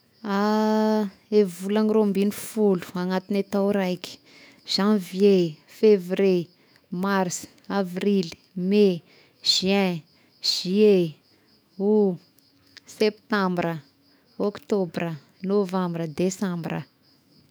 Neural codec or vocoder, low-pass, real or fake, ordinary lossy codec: autoencoder, 48 kHz, 128 numbers a frame, DAC-VAE, trained on Japanese speech; none; fake; none